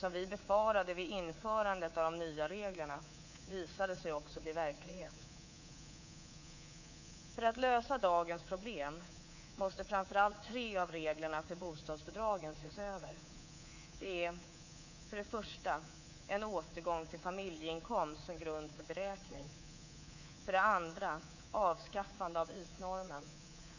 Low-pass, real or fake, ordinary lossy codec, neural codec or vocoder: 7.2 kHz; fake; none; codec, 24 kHz, 3.1 kbps, DualCodec